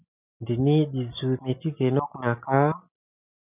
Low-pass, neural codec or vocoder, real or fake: 3.6 kHz; none; real